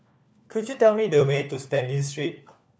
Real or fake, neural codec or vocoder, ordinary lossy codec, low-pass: fake; codec, 16 kHz, 4 kbps, FunCodec, trained on LibriTTS, 50 frames a second; none; none